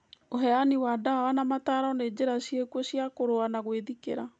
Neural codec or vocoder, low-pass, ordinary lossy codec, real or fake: none; 9.9 kHz; none; real